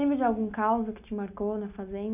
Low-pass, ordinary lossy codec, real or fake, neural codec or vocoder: 3.6 kHz; none; real; none